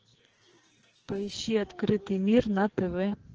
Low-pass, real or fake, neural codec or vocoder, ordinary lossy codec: 7.2 kHz; fake; codec, 44.1 kHz, 2.6 kbps, SNAC; Opus, 16 kbps